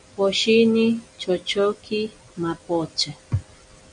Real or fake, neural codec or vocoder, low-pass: real; none; 9.9 kHz